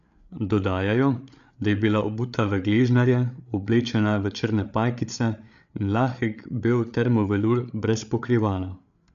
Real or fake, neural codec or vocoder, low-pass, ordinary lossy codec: fake; codec, 16 kHz, 8 kbps, FreqCodec, larger model; 7.2 kHz; none